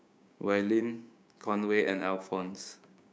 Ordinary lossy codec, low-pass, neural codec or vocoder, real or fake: none; none; codec, 16 kHz, 6 kbps, DAC; fake